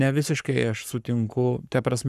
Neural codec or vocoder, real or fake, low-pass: codec, 44.1 kHz, 7.8 kbps, Pupu-Codec; fake; 14.4 kHz